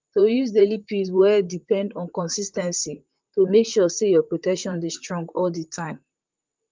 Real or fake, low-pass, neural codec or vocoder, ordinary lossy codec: fake; 7.2 kHz; vocoder, 44.1 kHz, 128 mel bands, Pupu-Vocoder; Opus, 24 kbps